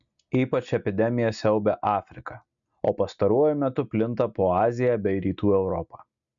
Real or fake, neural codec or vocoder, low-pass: real; none; 7.2 kHz